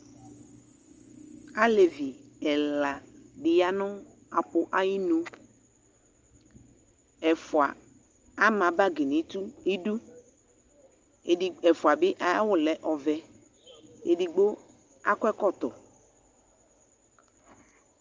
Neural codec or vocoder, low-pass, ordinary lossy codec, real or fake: none; 7.2 kHz; Opus, 24 kbps; real